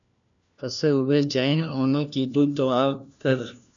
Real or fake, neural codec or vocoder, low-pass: fake; codec, 16 kHz, 1 kbps, FunCodec, trained on LibriTTS, 50 frames a second; 7.2 kHz